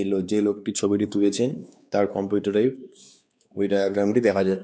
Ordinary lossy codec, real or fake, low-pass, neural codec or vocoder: none; fake; none; codec, 16 kHz, 2 kbps, X-Codec, WavLM features, trained on Multilingual LibriSpeech